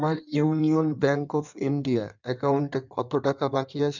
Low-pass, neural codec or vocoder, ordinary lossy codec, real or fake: 7.2 kHz; codec, 16 kHz in and 24 kHz out, 1.1 kbps, FireRedTTS-2 codec; none; fake